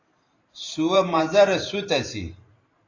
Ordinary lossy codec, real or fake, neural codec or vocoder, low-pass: AAC, 32 kbps; fake; vocoder, 44.1 kHz, 128 mel bands every 512 samples, BigVGAN v2; 7.2 kHz